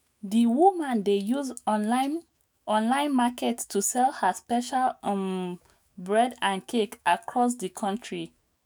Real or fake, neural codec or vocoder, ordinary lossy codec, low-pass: fake; autoencoder, 48 kHz, 128 numbers a frame, DAC-VAE, trained on Japanese speech; none; none